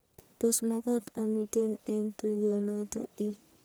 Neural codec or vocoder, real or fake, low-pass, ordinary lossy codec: codec, 44.1 kHz, 1.7 kbps, Pupu-Codec; fake; none; none